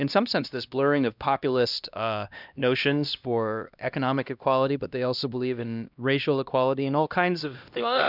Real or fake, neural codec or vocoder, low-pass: fake; codec, 16 kHz, 1 kbps, X-Codec, HuBERT features, trained on LibriSpeech; 5.4 kHz